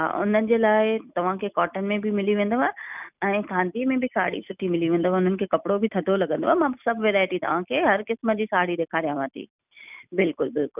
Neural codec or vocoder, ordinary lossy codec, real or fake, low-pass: none; none; real; 3.6 kHz